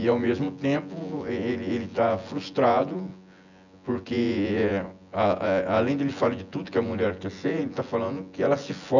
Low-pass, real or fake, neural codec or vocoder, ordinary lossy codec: 7.2 kHz; fake; vocoder, 24 kHz, 100 mel bands, Vocos; none